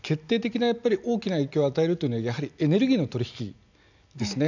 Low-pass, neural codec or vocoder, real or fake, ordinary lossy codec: 7.2 kHz; none; real; none